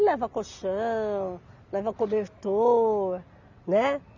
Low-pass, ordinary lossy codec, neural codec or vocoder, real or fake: 7.2 kHz; none; none; real